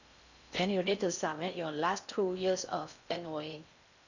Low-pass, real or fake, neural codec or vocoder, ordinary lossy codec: 7.2 kHz; fake; codec, 16 kHz in and 24 kHz out, 0.6 kbps, FocalCodec, streaming, 4096 codes; none